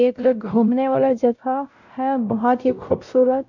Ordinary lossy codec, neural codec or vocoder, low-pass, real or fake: none; codec, 16 kHz, 0.5 kbps, X-Codec, WavLM features, trained on Multilingual LibriSpeech; 7.2 kHz; fake